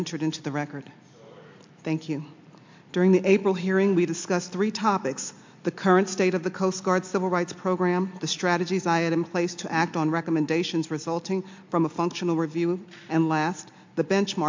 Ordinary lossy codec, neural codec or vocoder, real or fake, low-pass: MP3, 64 kbps; none; real; 7.2 kHz